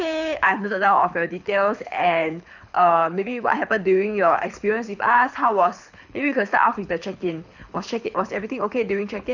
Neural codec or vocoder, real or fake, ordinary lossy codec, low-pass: codec, 24 kHz, 6 kbps, HILCodec; fake; none; 7.2 kHz